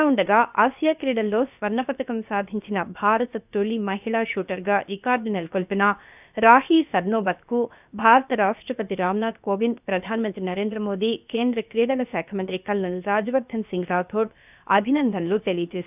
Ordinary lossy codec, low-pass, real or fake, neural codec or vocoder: none; 3.6 kHz; fake; codec, 16 kHz, about 1 kbps, DyCAST, with the encoder's durations